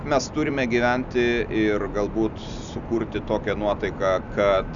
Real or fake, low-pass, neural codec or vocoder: real; 7.2 kHz; none